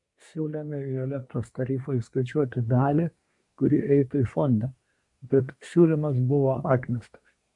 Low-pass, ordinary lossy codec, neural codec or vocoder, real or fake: 10.8 kHz; MP3, 64 kbps; codec, 24 kHz, 1 kbps, SNAC; fake